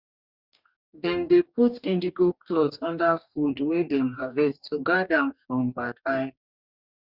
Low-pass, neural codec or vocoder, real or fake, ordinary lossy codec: 5.4 kHz; codec, 44.1 kHz, 2.6 kbps, DAC; fake; Opus, 64 kbps